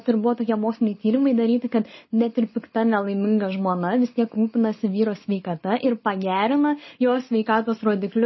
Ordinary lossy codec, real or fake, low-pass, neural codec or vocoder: MP3, 24 kbps; real; 7.2 kHz; none